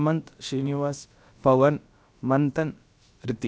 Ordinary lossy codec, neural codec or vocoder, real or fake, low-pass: none; codec, 16 kHz, about 1 kbps, DyCAST, with the encoder's durations; fake; none